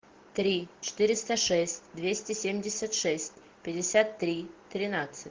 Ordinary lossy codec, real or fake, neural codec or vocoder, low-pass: Opus, 16 kbps; real; none; 7.2 kHz